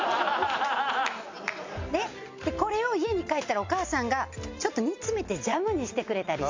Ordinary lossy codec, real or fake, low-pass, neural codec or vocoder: MP3, 48 kbps; real; 7.2 kHz; none